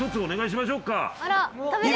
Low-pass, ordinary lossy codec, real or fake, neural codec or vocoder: none; none; real; none